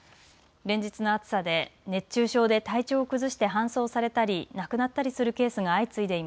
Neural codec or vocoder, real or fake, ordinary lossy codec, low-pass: none; real; none; none